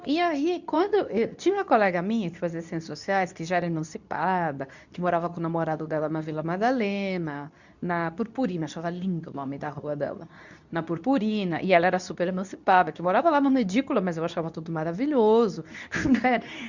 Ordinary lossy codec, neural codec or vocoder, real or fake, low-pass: none; codec, 24 kHz, 0.9 kbps, WavTokenizer, medium speech release version 2; fake; 7.2 kHz